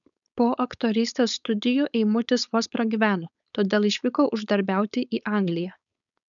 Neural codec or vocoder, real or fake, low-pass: codec, 16 kHz, 4.8 kbps, FACodec; fake; 7.2 kHz